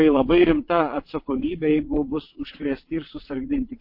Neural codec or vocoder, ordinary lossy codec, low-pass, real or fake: vocoder, 22.05 kHz, 80 mel bands, WaveNeXt; MP3, 32 kbps; 5.4 kHz; fake